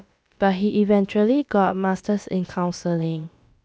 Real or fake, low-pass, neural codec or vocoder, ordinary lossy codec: fake; none; codec, 16 kHz, about 1 kbps, DyCAST, with the encoder's durations; none